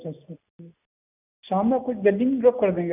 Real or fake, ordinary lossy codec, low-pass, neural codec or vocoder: real; none; 3.6 kHz; none